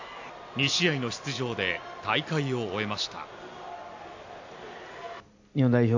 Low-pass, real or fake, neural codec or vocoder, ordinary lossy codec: 7.2 kHz; real; none; AAC, 48 kbps